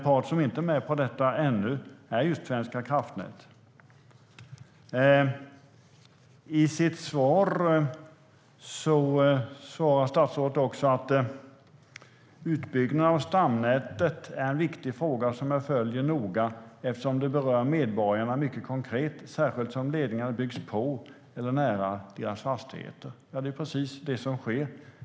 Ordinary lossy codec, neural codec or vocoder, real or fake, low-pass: none; none; real; none